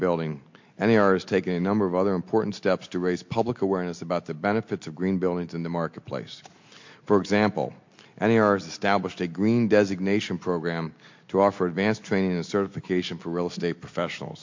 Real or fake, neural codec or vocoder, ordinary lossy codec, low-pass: real; none; MP3, 48 kbps; 7.2 kHz